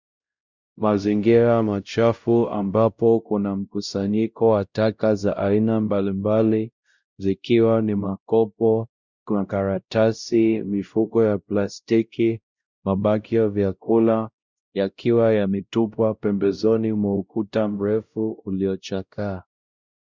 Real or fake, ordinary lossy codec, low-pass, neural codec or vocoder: fake; Opus, 64 kbps; 7.2 kHz; codec, 16 kHz, 0.5 kbps, X-Codec, WavLM features, trained on Multilingual LibriSpeech